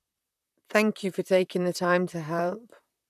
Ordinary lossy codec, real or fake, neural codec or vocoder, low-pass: none; fake; vocoder, 44.1 kHz, 128 mel bands, Pupu-Vocoder; 14.4 kHz